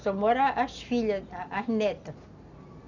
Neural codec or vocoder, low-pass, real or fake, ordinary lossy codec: none; 7.2 kHz; real; none